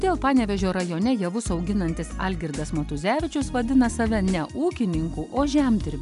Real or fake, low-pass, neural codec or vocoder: real; 10.8 kHz; none